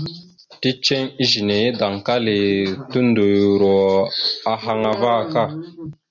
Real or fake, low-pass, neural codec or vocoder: real; 7.2 kHz; none